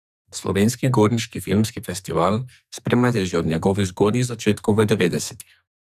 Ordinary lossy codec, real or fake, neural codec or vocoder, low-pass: none; fake; codec, 44.1 kHz, 2.6 kbps, SNAC; 14.4 kHz